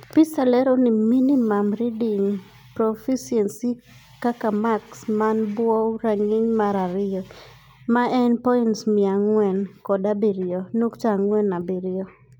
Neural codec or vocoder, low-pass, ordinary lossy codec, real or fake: none; 19.8 kHz; none; real